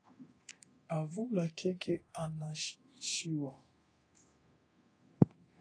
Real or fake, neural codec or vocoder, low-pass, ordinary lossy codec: fake; codec, 24 kHz, 0.9 kbps, DualCodec; 9.9 kHz; AAC, 32 kbps